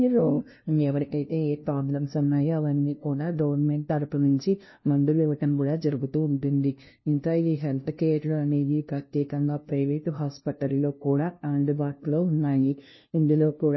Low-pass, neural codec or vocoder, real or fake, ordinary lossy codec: 7.2 kHz; codec, 16 kHz, 0.5 kbps, FunCodec, trained on LibriTTS, 25 frames a second; fake; MP3, 24 kbps